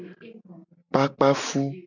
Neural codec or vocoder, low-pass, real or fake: none; 7.2 kHz; real